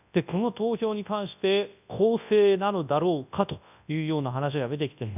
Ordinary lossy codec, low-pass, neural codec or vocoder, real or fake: none; 3.6 kHz; codec, 24 kHz, 0.9 kbps, WavTokenizer, large speech release; fake